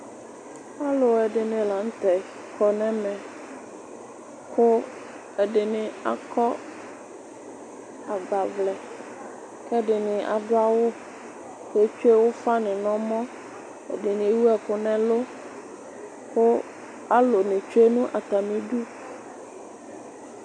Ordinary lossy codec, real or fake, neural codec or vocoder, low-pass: MP3, 96 kbps; real; none; 9.9 kHz